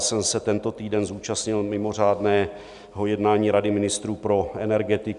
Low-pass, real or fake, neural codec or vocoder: 10.8 kHz; real; none